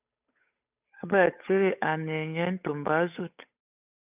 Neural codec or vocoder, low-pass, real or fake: codec, 16 kHz, 8 kbps, FunCodec, trained on Chinese and English, 25 frames a second; 3.6 kHz; fake